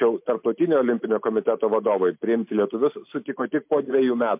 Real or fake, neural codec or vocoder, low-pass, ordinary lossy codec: real; none; 3.6 kHz; MP3, 32 kbps